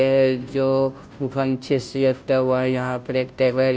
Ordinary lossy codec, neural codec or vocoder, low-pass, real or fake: none; codec, 16 kHz, 0.5 kbps, FunCodec, trained on Chinese and English, 25 frames a second; none; fake